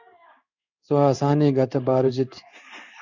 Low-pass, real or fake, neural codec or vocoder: 7.2 kHz; fake; codec, 16 kHz in and 24 kHz out, 1 kbps, XY-Tokenizer